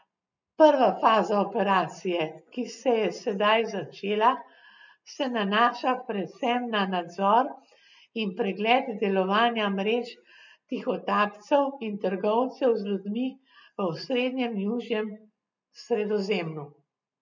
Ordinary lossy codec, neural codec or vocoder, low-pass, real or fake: none; none; 7.2 kHz; real